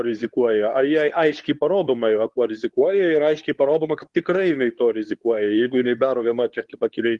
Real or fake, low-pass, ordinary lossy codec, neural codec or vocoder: fake; 10.8 kHz; Opus, 24 kbps; codec, 24 kHz, 0.9 kbps, WavTokenizer, medium speech release version 2